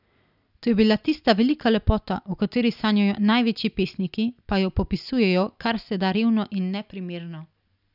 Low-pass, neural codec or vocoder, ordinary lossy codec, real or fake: 5.4 kHz; none; none; real